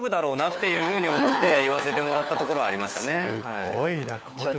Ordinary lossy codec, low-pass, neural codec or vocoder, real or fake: none; none; codec, 16 kHz, 4 kbps, FunCodec, trained on LibriTTS, 50 frames a second; fake